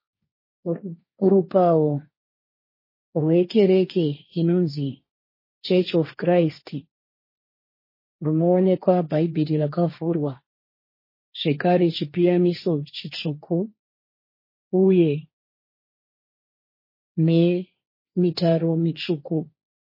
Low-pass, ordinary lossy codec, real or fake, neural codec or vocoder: 5.4 kHz; MP3, 24 kbps; fake; codec, 16 kHz, 1.1 kbps, Voila-Tokenizer